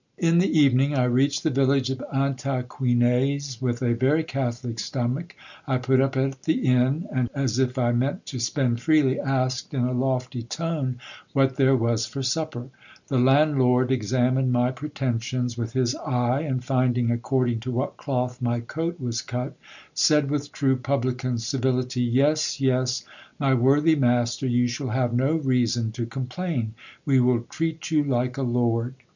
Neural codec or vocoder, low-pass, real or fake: none; 7.2 kHz; real